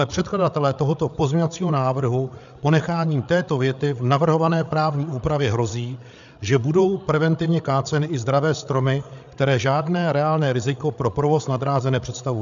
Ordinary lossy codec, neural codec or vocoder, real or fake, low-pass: MP3, 96 kbps; codec, 16 kHz, 8 kbps, FreqCodec, larger model; fake; 7.2 kHz